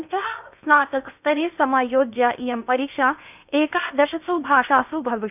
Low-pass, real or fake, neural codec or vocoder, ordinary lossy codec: 3.6 kHz; fake; codec, 16 kHz in and 24 kHz out, 0.8 kbps, FocalCodec, streaming, 65536 codes; none